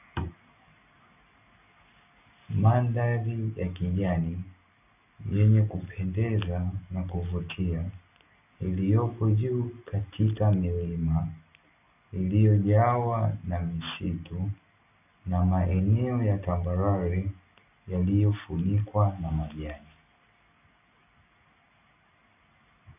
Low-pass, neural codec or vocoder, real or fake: 3.6 kHz; none; real